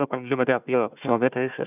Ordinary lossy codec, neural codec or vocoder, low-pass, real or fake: none; codec, 16 kHz, 1 kbps, FunCodec, trained on Chinese and English, 50 frames a second; 3.6 kHz; fake